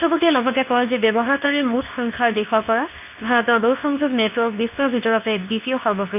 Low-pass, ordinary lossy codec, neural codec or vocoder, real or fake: 3.6 kHz; none; codec, 24 kHz, 0.9 kbps, WavTokenizer, medium speech release version 2; fake